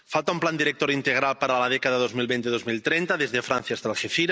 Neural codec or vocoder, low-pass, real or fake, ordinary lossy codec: none; none; real; none